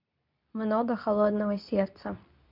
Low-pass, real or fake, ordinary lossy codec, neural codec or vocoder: 5.4 kHz; fake; none; codec, 24 kHz, 0.9 kbps, WavTokenizer, medium speech release version 2